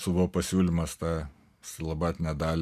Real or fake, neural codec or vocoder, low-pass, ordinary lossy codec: real; none; 14.4 kHz; MP3, 96 kbps